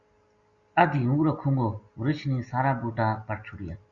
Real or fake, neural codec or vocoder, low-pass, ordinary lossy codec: real; none; 7.2 kHz; Opus, 64 kbps